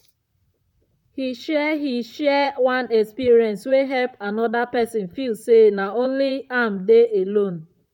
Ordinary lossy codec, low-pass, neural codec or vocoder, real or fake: none; 19.8 kHz; vocoder, 44.1 kHz, 128 mel bands, Pupu-Vocoder; fake